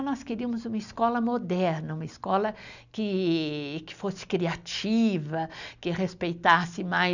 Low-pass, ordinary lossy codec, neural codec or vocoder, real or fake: 7.2 kHz; none; none; real